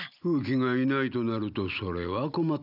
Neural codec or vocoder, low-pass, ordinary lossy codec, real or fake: none; 5.4 kHz; none; real